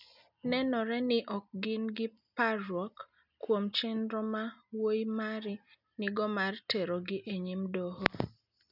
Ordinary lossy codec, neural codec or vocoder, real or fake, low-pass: none; none; real; 5.4 kHz